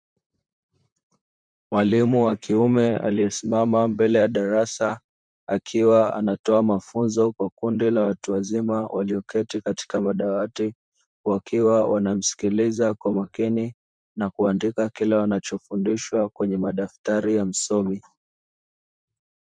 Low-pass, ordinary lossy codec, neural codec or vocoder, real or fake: 9.9 kHz; MP3, 96 kbps; vocoder, 44.1 kHz, 128 mel bands, Pupu-Vocoder; fake